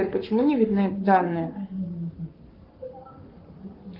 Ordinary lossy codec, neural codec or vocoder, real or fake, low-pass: Opus, 32 kbps; codec, 44.1 kHz, 7.8 kbps, Pupu-Codec; fake; 5.4 kHz